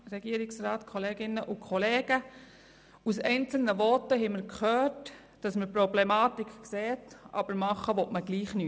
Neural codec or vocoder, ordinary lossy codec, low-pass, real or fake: none; none; none; real